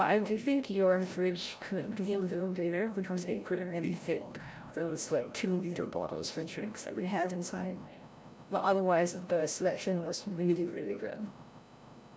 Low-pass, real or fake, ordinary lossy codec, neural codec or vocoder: none; fake; none; codec, 16 kHz, 0.5 kbps, FreqCodec, larger model